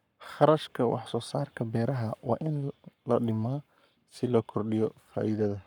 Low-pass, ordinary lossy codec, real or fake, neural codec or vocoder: 19.8 kHz; none; fake; codec, 44.1 kHz, 7.8 kbps, Pupu-Codec